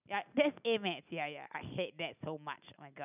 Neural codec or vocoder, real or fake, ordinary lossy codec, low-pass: none; real; none; 3.6 kHz